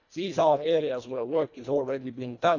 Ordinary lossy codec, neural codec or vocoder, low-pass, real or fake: none; codec, 24 kHz, 1.5 kbps, HILCodec; 7.2 kHz; fake